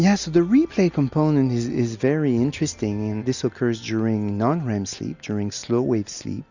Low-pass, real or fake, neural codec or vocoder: 7.2 kHz; real; none